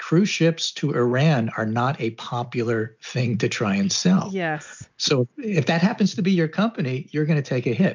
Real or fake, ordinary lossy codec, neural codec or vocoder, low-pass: real; MP3, 64 kbps; none; 7.2 kHz